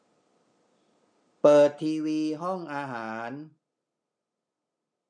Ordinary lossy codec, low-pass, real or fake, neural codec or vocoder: MP3, 64 kbps; 9.9 kHz; real; none